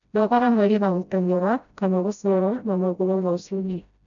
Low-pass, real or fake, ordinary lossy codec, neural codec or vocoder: 7.2 kHz; fake; none; codec, 16 kHz, 0.5 kbps, FreqCodec, smaller model